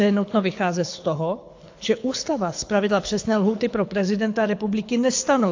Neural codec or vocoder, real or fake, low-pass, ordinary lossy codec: codec, 24 kHz, 6 kbps, HILCodec; fake; 7.2 kHz; AAC, 48 kbps